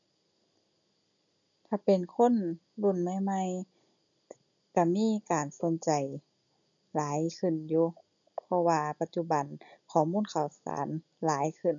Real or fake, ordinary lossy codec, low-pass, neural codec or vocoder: real; none; 7.2 kHz; none